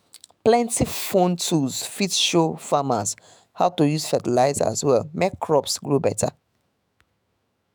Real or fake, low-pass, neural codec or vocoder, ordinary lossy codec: fake; none; autoencoder, 48 kHz, 128 numbers a frame, DAC-VAE, trained on Japanese speech; none